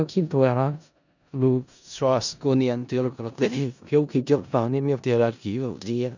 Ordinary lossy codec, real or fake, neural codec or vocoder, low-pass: none; fake; codec, 16 kHz in and 24 kHz out, 0.4 kbps, LongCat-Audio-Codec, four codebook decoder; 7.2 kHz